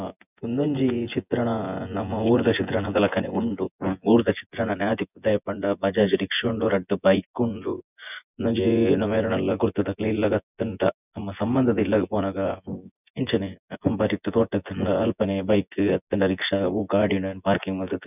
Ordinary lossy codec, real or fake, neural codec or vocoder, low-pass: none; fake; vocoder, 24 kHz, 100 mel bands, Vocos; 3.6 kHz